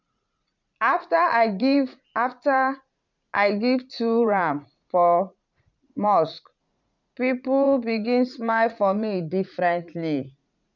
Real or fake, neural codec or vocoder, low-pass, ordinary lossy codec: fake; vocoder, 44.1 kHz, 80 mel bands, Vocos; 7.2 kHz; none